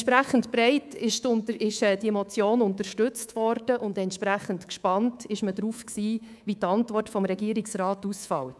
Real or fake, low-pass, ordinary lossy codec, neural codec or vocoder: fake; none; none; codec, 24 kHz, 3.1 kbps, DualCodec